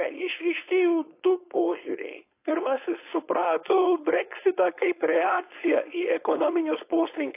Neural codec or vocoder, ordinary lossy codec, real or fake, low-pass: codec, 16 kHz, 4.8 kbps, FACodec; AAC, 24 kbps; fake; 3.6 kHz